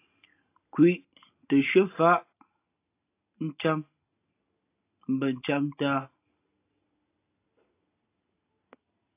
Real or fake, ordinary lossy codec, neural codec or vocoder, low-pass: real; AAC, 24 kbps; none; 3.6 kHz